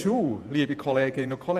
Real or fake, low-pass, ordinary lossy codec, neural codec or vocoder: fake; 14.4 kHz; none; vocoder, 48 kHz, 128 mel bands, Vocos